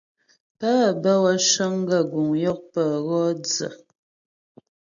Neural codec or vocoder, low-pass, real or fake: none; 7.2 kHz; real